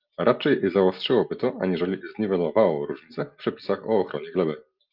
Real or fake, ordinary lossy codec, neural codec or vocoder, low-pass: real; Opus, 24 kbps; none; 5.4 kHz